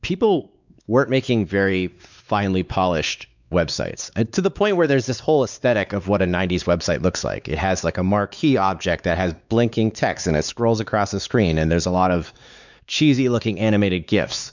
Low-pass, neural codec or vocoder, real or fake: 7.2 kHz; codec, 16 kHz, 4 kbps, X-Codec, WavLM features, trained on Multilingual LibriSpeech; fake